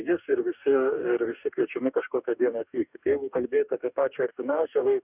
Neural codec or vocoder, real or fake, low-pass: codec, 44.1 kHz, 2.6 kbps, DAC; fake; 3.6 kHz